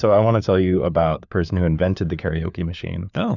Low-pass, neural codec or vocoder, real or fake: 7.2 kHz; codec, 16 kHz, 4 kbps, FunCodec, trained on LibriTTS, 50 frames a second; fake